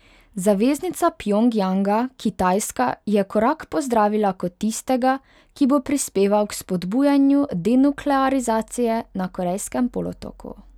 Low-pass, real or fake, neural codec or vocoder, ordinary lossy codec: 19.8 kHz; real; none; none